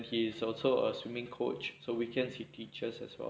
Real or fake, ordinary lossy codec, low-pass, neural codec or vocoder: real; none; none; none